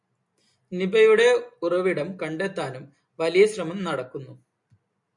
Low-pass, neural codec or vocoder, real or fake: 10.8 kHz; none; real